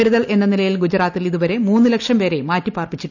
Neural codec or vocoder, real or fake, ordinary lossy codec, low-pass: none; real; none; 7.2 kHz